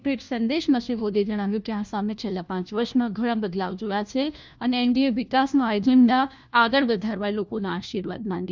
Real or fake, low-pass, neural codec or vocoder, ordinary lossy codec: fake; none; codec, 16 kHz, 1 kbps, FunCodec, trained on LibriTTS, 50 frames a second; none